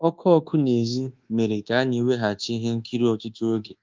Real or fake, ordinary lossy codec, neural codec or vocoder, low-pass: fake; Opus, 32 kbps; codec, 24 kHz, 1.2 kbps, DualCodec; 7.2 kHz